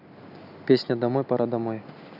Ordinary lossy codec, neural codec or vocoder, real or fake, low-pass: none; none; real; 5.4 kHz